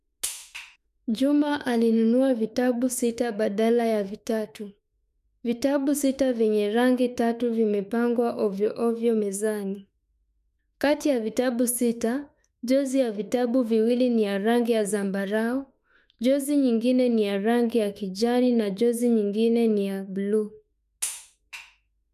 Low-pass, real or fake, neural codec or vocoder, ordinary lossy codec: 14.4 kHz; fake; autoencoder, 48 kHz, 32 numbers a frame, DAC-VAE, trained on Japanese speech; none